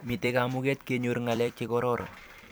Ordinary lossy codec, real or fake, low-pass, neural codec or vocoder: none; fake; none; vocoder, 44.1 kHz, 128 mel bands every 256 samples, BigVGAN v2